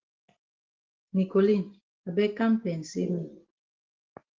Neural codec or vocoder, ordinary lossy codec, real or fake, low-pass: none; Opus, 16 kbps; real; 7.2 kHz